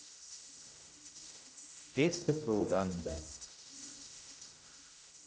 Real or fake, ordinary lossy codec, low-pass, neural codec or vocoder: fake; none; none; codec, 16 kHz, 0.5 kbps, X-Codec, HuBERT features, trained on general audio